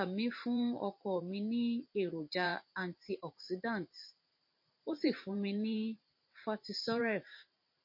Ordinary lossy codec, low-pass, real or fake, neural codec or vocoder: MP3, 32 kbps; 5.4 kHz; fake; vocoder, 44.1 kHz, 128 mel bands every 512 samples, BigVGAN v2